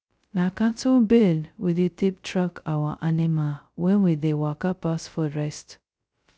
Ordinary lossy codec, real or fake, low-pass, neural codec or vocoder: none; fake; none; codec, 16 kHz, 0.2 kbps, FocalCodec